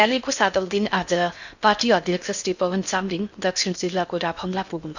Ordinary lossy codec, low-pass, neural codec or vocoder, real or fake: none; 7.2 kHz; codec, 16 kHz in and 24 kHz out, 0.6 kbps, FocalCodec, streaming, 4096 codes; fake